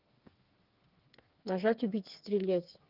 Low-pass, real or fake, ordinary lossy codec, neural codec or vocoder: 5.4 kHz; fake; Opus, 32 kbps; codec, 16 kHz, 4 kbps, FreqCodec, smaller model